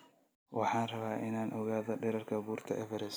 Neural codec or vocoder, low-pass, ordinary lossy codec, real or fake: none; none; none; real